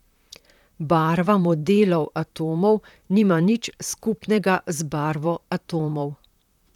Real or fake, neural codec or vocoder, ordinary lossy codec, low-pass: fake; vocoder, 44.1 kHz, 128 mel bands, Pupu-Vocoder; none; 19.8 kHz